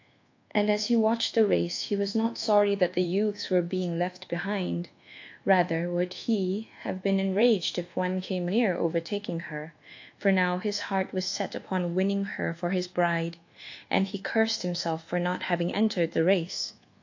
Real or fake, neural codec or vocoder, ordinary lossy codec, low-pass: fake; codec, 24 kHz, 1.2 kbps, DualCodec; AAC, 48 kbps; 7.2 kHz